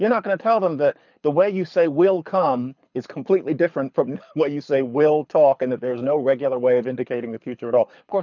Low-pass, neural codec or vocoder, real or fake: 7.2 kHz; codec, 24 kHz, 6 kbps, HILCodec; fake